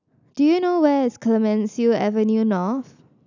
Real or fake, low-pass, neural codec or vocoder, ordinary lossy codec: real; 7.2 kHz; none; none